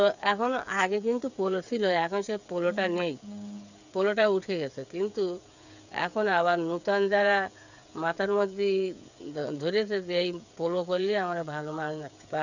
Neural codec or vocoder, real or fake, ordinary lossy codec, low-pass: codec, 16 kHz, 8 kbps, FreqCodec, smaller model; fake; none; 7.2 kHz